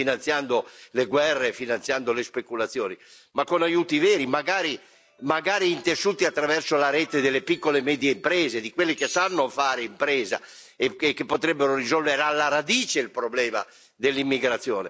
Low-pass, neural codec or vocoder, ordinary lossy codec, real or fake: none; none; none; real